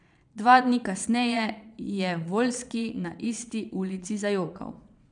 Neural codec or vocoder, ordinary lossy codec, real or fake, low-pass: vocoder, 22.05 kHz, 80 mel bands, Vocos; none; fake; 9.9 kHz